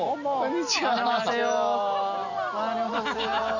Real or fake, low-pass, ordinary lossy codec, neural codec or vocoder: real; 7.2 kHz; none; none